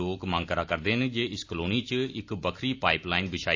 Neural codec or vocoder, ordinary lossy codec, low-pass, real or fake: none; AAC, 48 kbps; 7.2 kHz; real